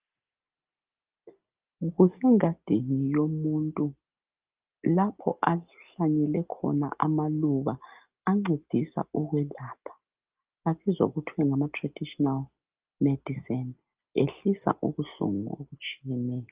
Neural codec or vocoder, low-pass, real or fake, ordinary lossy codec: none; 3.6 kHz; real; Opus, 32 kbps